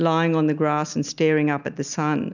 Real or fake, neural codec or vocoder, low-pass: real; none; 7.2 kHz